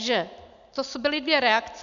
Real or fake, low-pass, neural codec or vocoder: real; 7.2 kHz; none